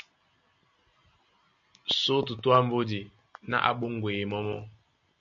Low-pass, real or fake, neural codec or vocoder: 7.2 kHz; real; none